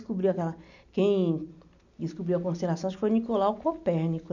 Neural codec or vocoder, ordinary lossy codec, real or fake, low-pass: none; none; real; 7.2 kHz